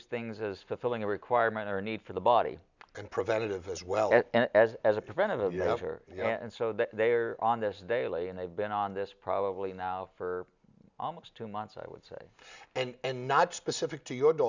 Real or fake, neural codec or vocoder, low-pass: real; none; 7.2 kHz